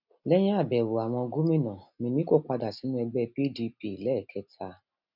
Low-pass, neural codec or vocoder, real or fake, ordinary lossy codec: 5.4 kHz; none; real; none